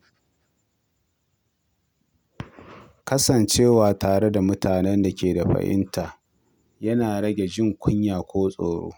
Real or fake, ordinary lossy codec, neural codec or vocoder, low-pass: real; none; none; none